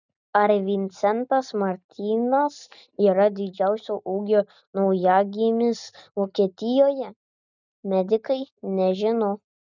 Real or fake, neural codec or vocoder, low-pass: real; none; 7.2 kHz